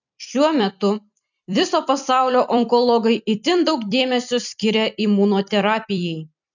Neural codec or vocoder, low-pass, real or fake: vocoder, 44.1 kHz, 128 mel bands every 256 samples, BigVGAN v2; 7.2 kHz; fake